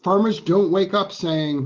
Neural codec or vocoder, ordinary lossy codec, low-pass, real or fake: none; Opus, 16 kbps; 7.2 kHz; real